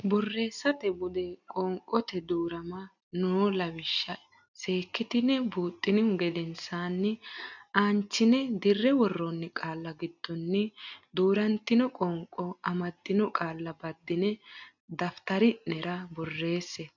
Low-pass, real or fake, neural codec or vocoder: 7.2 kHz; real; none